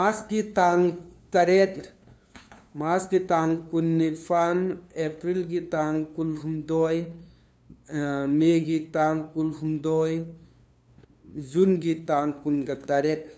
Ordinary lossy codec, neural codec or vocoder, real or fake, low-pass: none; codec, 16 kHz, 2 kbps, FunCodec, trained on LibriTTS, 25 frames a second; fake; none